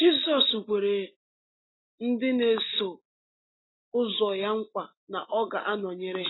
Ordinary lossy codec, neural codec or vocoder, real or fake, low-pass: AAC, 16 kbps; none; real; 7.2 kHz